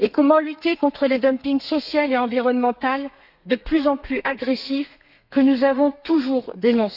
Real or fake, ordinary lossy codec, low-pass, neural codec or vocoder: fake; none; 5.4 kHz; codec, 32 kHz, 1.9 kbps, SNAC